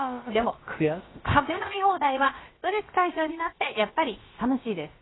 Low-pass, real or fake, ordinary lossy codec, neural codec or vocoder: 7.2 kHz; fake; AAC, 16 kbps; codec, 16 kHz, about 1 kbps, DyCAST, with the encoder's durations